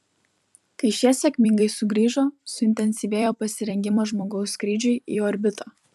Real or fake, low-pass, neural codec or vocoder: fake; 14.4 kHz; vocoder, 44.1 kHz, 128 mel bands every 256 samples, BigVGAN v2